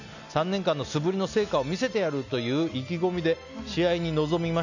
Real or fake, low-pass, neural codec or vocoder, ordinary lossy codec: real; 7.2 kHz; none; none